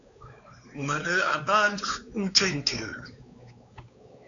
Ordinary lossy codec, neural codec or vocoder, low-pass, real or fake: AAC, 48 kbps; codec, 16 kHz, 4 kbps, X-Codec, WavLM features, trained on Multilingual LibriSpeech; 7.2 kHz; fake